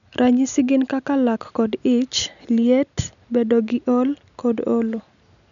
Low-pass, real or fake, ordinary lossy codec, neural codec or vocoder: 7.2 kHz; real; none; none